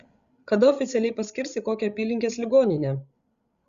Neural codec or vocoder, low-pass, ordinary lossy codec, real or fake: codec, 16 kHz, 16 kbps, FreqCodec, larger model; 7.2 kHz; Opus, 64 kbps; fake